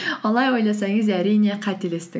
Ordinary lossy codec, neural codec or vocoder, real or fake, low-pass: none; none; real; none